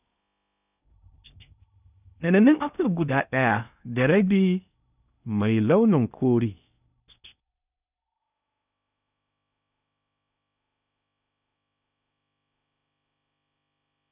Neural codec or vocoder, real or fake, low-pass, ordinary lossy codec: codec, 16 kHz in and 24 kHz out, 0.6 kbps, FocalCodec, streaming, 4096 codes; fake; 3.6 kHz; none